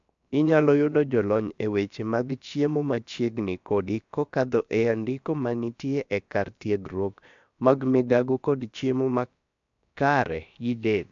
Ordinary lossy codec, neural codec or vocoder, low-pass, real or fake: MP3, 64 kbps; codec, 16 kHz, about 1 kbps, DyCAST, with the encoder's durations; 7.2 kHz; fake